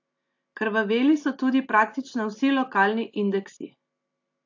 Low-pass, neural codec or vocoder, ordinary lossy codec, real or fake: 7.2 kHz; none; AAC, 48 kbps; real